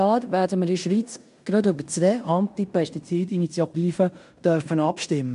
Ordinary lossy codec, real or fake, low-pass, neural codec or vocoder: none; fake; 10.8 kHz; codec, 16 kHz in and 24 kHz out, 0.9 kbps, LongCat-Audio-Codec, fine tuned four codebook decoder